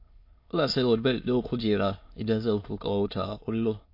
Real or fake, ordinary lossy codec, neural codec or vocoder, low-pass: fake; MP3, 32 kbps; autoencoder, 22.05 kHz, a latent of 192 numbers a frame, VITS, trained on many speakers; 5.4 kHz